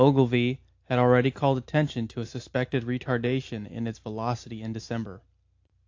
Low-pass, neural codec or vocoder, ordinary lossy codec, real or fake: 7.2 kHz; none; AAC, 48 kbps; real